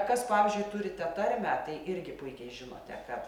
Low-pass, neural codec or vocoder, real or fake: 19.8 kHz; none; real